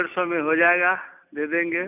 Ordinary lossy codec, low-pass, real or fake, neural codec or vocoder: none; 3.6 kHz; real; none